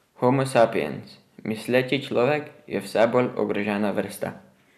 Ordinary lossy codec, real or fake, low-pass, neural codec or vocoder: none; real; 14.4 kHz; none